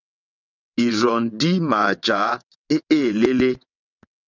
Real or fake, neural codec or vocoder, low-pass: fake; vocoder, 22.05 kHz, 80 mel bands, WaveNeXt; 7.2 kHz